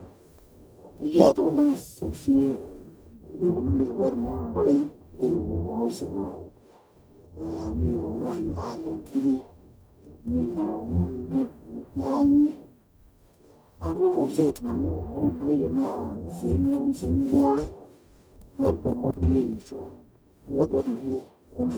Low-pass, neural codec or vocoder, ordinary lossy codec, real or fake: none; codec, 44.1 kHz, 0.9 kbps, DAC; none; fake